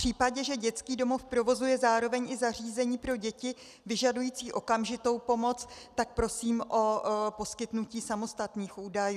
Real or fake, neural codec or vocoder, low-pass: real; none; 14.4 kHz